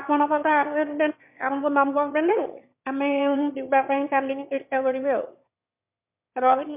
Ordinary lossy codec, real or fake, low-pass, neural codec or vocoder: MP3, 32 kbps; fake; 3.6 kHz; autoencoder, 22.05 kHz, a latent of 192 numbers a frame, VITS, trained on one speaker